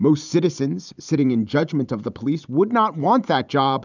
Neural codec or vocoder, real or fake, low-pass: vocoder, 44.1 kHz, 128 mel bands every 512 samples, BigVGAN v2; fake; 7.2 kHz